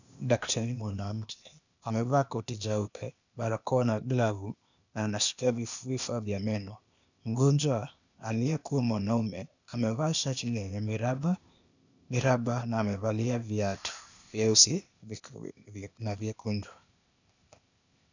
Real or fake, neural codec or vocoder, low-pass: fake; codec, 16 kHz, 0.8 kbps, ZipCodec; 7.2 kHz